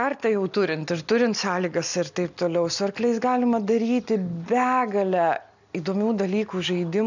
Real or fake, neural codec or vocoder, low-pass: real; none; 7.2 kHz